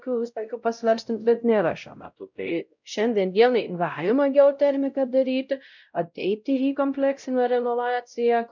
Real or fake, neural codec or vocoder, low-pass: fake; codec, 16 kHz, 0.5 kbps, X-Codec, WavLM features, trained on Multilingual LibriSpeech; 7.2 kHz